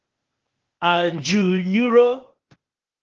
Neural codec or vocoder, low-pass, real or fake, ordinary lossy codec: codec, 16 kHz, 0.8 kbps, ZipCodec; 7.2 kHz; fake; Opus, 16 kbps